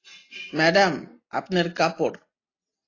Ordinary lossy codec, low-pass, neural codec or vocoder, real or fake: AAC, 32 kbps; 7.2 kHz; none; real